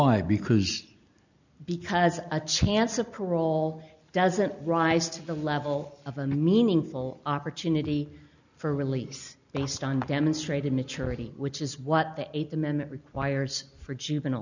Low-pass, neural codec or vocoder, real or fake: 7.2 kHz; none; real